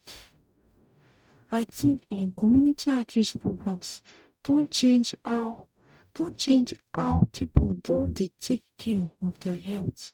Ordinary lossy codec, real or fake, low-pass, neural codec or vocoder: none; fake; 19.8 kHz; codec, 44.1 kHz, 0.9 kbps, DAC